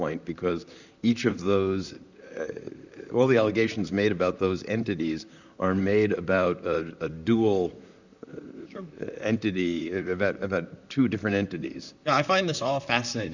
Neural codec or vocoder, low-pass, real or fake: vocoder, 44.1 kHz, 128 mel bands, Pupu-Vocoder; 7.2 kHz; fake